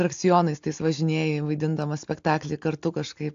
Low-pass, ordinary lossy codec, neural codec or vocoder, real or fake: 7.2 kHz; AAC, 64 kbps; none; real